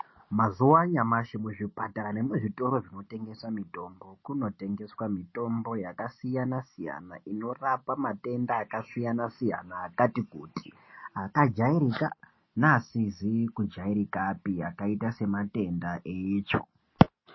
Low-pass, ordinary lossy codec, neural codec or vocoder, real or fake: 7.2 kHz; MP3, 24 kbps; none; real